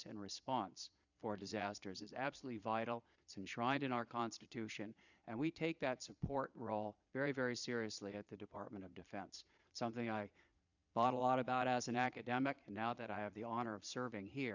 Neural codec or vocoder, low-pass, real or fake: vocoder, 22.05 kHz, 80 mel bands, Vocos; 7.2 kHz; fake